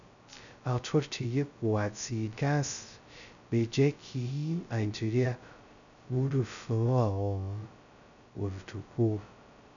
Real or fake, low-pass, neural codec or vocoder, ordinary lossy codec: fake; 7.2 kHz; codec, 16 kHz, 0.2 kbps, FocalCodec; none